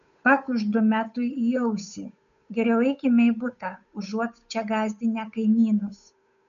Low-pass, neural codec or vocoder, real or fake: 7.2 kHz; codec, 16 kHz, 8 kbps, FunCodec, trained on Chinese and English, 25 frames a second; fake